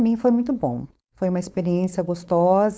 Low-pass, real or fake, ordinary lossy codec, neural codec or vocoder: none; fake; none; codec, 16 kHz, 4.8 kbps, FACodec